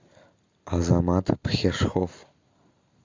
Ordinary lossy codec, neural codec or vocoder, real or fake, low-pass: MP3, 64 kbps; none; real; 7.2 kHz